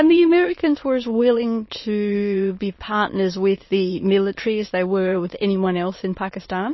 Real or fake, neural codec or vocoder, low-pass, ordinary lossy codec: fake; autoencoder, 22.05 kHz, a latent of 192 numbers a frame, VITS, trained on many speakers; 7.2 kHz; MP3, 24 kbps